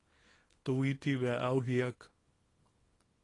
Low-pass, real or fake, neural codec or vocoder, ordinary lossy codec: 10.8 kHz; fake; codec, 24 kHz, 0.9 kbps, WavTokenizer, small release; AAC, 32 kbps